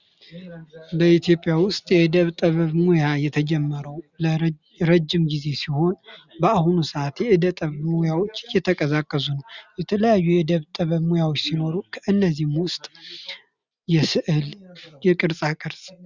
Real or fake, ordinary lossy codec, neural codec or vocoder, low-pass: real; Opus, 64 kbps; none; 7.2 kHz